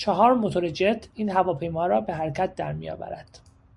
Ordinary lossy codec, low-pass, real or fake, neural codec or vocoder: Opus, 64 kbps; 10.8 kHz; real; none